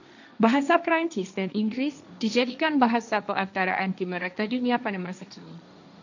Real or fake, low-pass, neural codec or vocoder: fake; 7.2 kHz; codec, 16 kHz, 1.1 kbps, Voila-Tokenizer